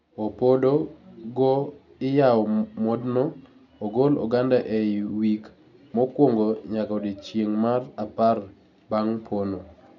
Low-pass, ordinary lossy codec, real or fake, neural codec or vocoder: 7.2 kHz; none; real; none